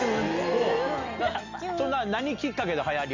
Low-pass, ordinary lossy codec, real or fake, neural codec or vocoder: 7.2 kHz; none; real; none